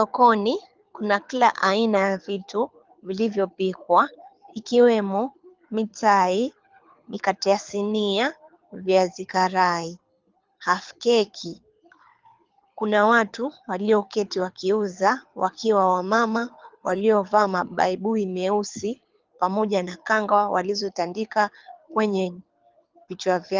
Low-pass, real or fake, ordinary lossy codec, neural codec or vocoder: 7.2 kHz; fake; Opus, 24 kbps; codec, 24 kHz, 6 kbps, HILCodec